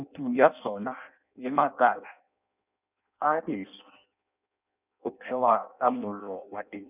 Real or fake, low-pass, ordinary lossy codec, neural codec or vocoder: fake; 3.6 kHz; none; codec, 16 kHz in and 24 kHz out, 0.6 kbps, FireRedTTS-2 codec